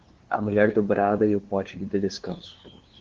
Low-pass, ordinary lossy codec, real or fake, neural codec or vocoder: 7.2 kHz; Opus, 16 kbps; fake; codec, 16 kHz, 2 kbps, FunCodec, trained on LibriTTS, 25 frames a second